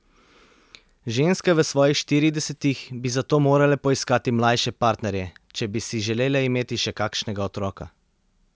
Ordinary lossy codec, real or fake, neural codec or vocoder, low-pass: none; real; none; none